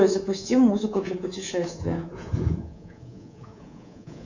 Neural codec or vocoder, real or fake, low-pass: codec, 24 kHz, 3.1 kbps, DualCodec; fake; 7.2 kHz